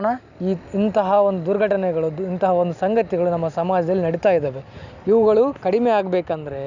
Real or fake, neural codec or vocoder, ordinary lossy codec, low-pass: real; none; none; 7.2 kHz